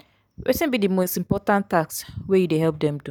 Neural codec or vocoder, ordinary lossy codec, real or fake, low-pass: none; none; real; none